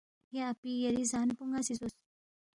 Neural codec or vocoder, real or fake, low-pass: none; real; 10.8 kHz